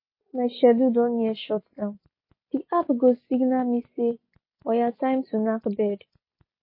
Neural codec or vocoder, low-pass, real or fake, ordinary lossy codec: none; 5.4 kHz; real; MP3, 24 kbps